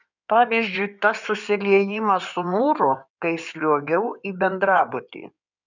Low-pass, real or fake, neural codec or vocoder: 7.2 kHz; fake; codec, 16 kHz in and 24 kHz out, 2.2 kbps, FireRedTTS-2 codec